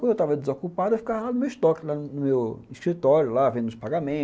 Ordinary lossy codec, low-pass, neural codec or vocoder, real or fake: none; none; none; real